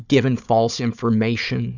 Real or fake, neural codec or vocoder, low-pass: fake; codec, 16 kHz, 4 kbps, FunCodec, trained on Chinese and English, 50 frames a second; 7.2 kHz